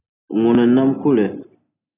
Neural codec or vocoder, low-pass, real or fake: none; 3.6 kHz; real